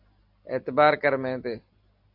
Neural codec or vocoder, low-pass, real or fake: none; 5.4 kHz; real